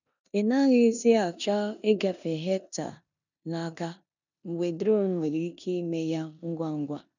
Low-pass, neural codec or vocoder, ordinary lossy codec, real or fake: 7.2 kHz; codec, 16 kHz in and 24 kHz out, 0.9 kbps, LongCat-Audio-Codec, four codebook decoder; none; fake